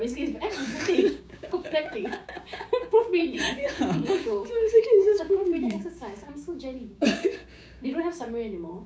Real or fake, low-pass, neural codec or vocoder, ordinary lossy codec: fake; none; codec, 16 kHz, 6 kbps, DAC; none